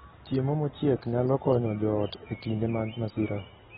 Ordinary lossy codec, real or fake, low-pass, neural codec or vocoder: AAC, 16 kbps; real; 19.8 kHz; none